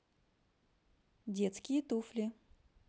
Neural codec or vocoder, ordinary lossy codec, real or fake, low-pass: none; none; real; none